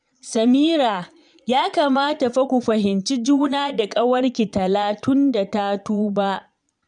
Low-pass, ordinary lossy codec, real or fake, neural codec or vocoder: 9.9 kHz; none; fake; vocoder, 22.05 kHz, 80 mel bands, Vocos